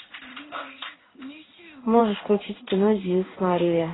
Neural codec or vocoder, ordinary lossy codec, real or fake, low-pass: codec, 16 kHz in and 24 kHz out, 1 kbps, XY-Tokenizer; AAC, 16 kbps; fake; 7.2 kHz